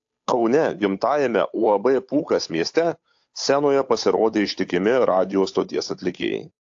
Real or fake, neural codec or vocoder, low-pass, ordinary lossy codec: fake; codec, 16 kHz, 8 kbps, FunCodec, trained on Chinese and English, 25 frames a second; 7.2 kHz; AAC, 48 kbps